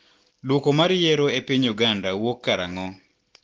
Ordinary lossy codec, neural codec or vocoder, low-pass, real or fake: Opus, 16 kbps; none; 7.2 kHz; real